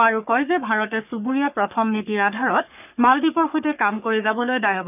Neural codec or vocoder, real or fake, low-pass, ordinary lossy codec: codec, 44.1 kHz, 3.4 kbps, Pupu-Codec; fake; 3.6 kHz; none